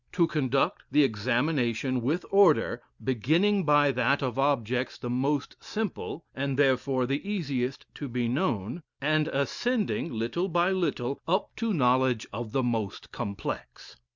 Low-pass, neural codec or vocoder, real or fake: 7.2 kHz; none; real